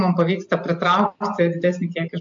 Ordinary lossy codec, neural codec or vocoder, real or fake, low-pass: AAC, 64 kbps; none; real; 7.2 kHz